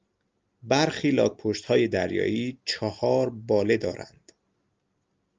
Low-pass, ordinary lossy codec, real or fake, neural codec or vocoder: 7.2 kHz; Opus, 24 kbps; real; none